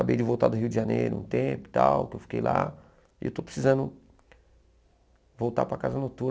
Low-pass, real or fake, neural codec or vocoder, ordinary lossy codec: none; real; none; none